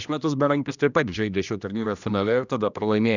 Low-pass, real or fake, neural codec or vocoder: 7.2 kHz; fake; codec, 16 kHz, 1 kbps, X-Codec, HuBERT features, trained on general audio